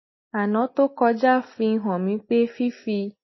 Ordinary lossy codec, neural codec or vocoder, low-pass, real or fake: MP3, 24 kbps; none; 7.2 kHz; real